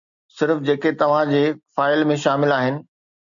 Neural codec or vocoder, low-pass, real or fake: none; 7.2 kHz; real